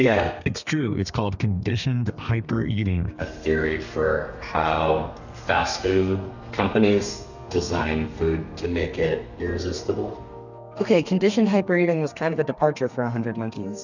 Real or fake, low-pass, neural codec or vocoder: fake; 7.2 kHz; codec, 32 kHz, 1.9 kbps, SNAC